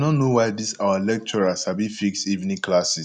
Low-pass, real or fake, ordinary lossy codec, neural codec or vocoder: none; real; none; none